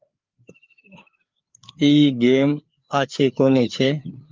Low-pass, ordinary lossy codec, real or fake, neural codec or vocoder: 7.2 kHz; Opus, 32 kbps; fake; codec, 16 kHz, 4 kbps, FreqCodec, larger model